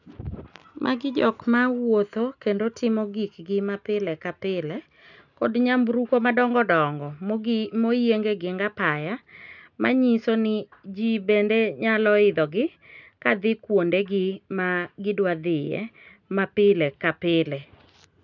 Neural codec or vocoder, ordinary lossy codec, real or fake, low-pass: none; none; real; 7.2 kHz